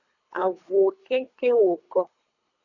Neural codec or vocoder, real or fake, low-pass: codec, 24 kHz, 3 kbps, HILCodec; fake; 7.2 kHz